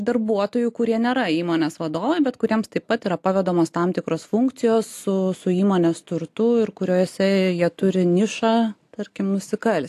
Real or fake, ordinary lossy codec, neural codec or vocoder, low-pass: real; AAC, 64 kbps; none; 14.4 kHz